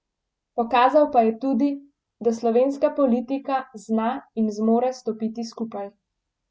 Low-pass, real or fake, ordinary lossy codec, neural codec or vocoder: none; real; none; none